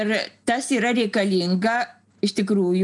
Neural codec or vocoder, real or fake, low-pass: none; real; 10.8 kHz